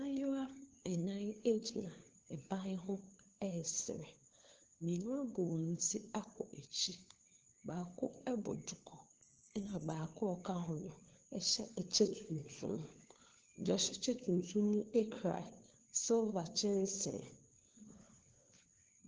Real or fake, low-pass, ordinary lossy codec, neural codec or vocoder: fake; 7.2 kHz; Opus, 16 kbps; codec, 16 kHz, 4 kbps, FunCodec, trained on LibriTTS, 50 frames a second